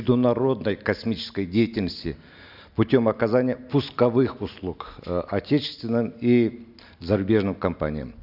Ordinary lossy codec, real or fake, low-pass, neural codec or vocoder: none; real; 5.4 kHz; none